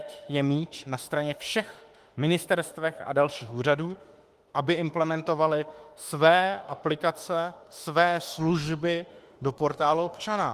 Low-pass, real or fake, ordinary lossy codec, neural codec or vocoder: 14.4 kHz; fake; Opus, 24 kbps; autoencoder, 48 kHz, 32 numbers a frame, DAC-VAE, trained on Japanese speech